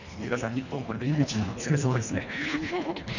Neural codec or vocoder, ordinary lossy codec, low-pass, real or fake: codec, 24 kHz, 1.5 kbps, HILCodec; none; 7.2 kHz; fake